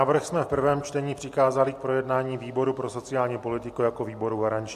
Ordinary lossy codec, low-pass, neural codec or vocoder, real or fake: MP3, 64 kbps; 14.4 kHz; none; real